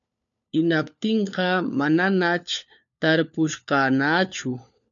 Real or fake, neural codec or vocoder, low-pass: fake; codec, 16 kHz, 4 kbps, FunCodec, trained on LibriTTS, 50 frames a second; 7.2 kHz